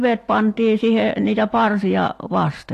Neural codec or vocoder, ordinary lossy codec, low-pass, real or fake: none; AAC, 48 kbps; 14.4 kHz; real